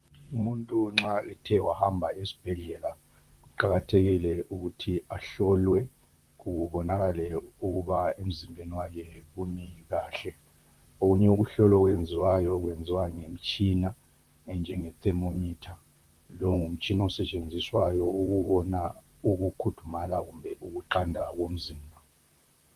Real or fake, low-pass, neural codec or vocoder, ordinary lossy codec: fake; 14.4 kHz; vocoder, 44.1 kHz, 128 mel bands, Pupu-Vocoder; Opus, 32 kbps